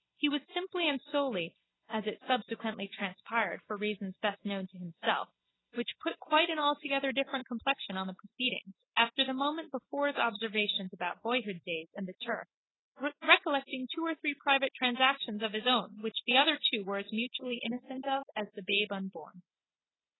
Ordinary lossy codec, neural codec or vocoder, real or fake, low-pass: AAC, 16 kbps; none; real; 7.2 kHz